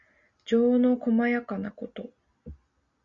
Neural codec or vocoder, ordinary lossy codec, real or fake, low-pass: none; Opus, 64 kbps; real; 7.2 kHz